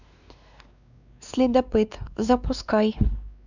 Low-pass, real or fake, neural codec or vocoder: 7.2 kHz; fake; codec, 16 kHz, 2 kbps, X-Codec, WavLM features, trained on Multilingual LibriSpeech